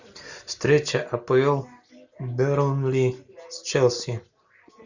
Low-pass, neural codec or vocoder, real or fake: 7.2 kHz; none; real